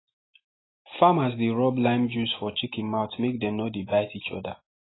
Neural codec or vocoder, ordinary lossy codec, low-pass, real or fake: none; AAC, 16 kbps; 7.2 kHz; real